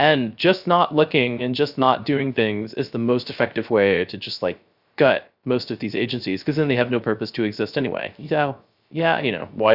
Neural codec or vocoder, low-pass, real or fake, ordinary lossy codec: codec, 16 kHz, 0.3 kbps, FocalCodec; 5.4 kHz; fake; Opus, 64 kbps